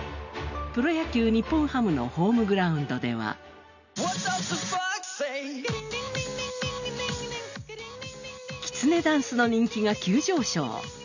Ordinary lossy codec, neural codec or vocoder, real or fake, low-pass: none; none; real; 7.2 kHz